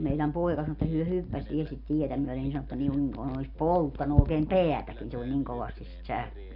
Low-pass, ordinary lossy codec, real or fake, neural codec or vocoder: 5.4 kHz; none; real; none